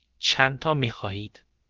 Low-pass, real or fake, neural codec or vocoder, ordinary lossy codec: 7.2 kHz; fake; codec, 16 kHz, about 1 kbps, DyCAST, with the encoder's durations; Opus, 16 kbps